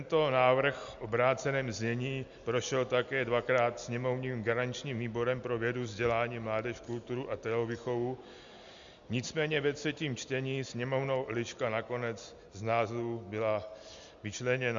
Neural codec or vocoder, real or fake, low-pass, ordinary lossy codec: none; real; 7.2 kHz; Opus, 64 kbps